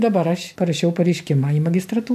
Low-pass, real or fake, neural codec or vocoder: 14.4 kHz; real; none